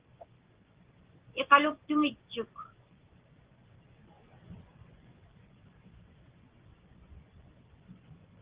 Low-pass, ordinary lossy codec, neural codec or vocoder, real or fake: 3.6 kHz; Opus, 16 kbps; vocoder, 24 kHz, 100 mel bands, Vocos; fake